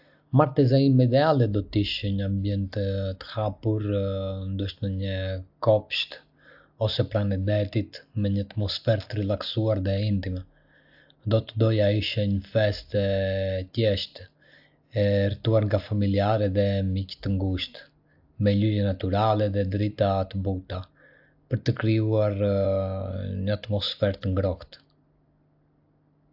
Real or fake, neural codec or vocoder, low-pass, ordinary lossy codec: real; none; 5.4 kHz; AAC, 48 kbps